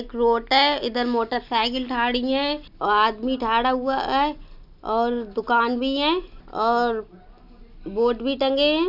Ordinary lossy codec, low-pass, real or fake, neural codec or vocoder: none; 5.4 kHz; real; none